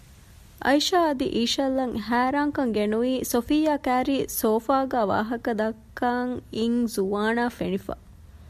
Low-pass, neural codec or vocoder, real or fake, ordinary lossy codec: 14.4 kHz; none; real; MP3, 96 kbps